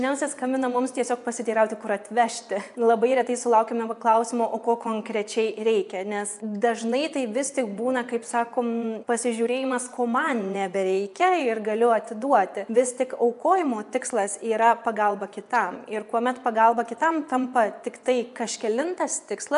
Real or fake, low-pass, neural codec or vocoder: fake; 10.8 kHz; vocoder, 24 kHz, 100 mel bands, Vocos